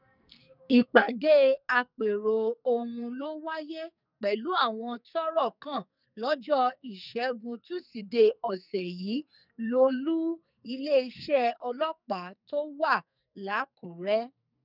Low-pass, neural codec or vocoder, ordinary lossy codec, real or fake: 5.4 kHz; codec, 44.1 kHz, 2.6 kbps, SNAC; none; fake